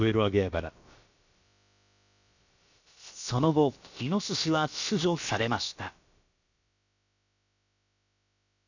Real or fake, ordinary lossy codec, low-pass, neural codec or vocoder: fake; none; 7.2 kHz; codec, 16 kHz, about 1 kbps, DyCAST, with the encoder's durations